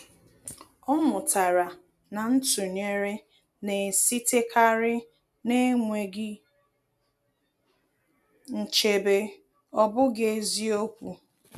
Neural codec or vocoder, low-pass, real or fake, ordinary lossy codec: none; 14.4 kHz; real; none